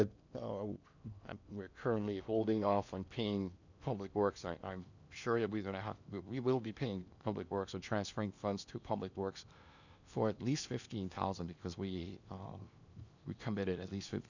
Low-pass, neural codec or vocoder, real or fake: 7.2 kHz; codec, 16 kHz in and 24 kHz out, 0.8 kbps, FocalCodec, streaming, 65536 codes; fake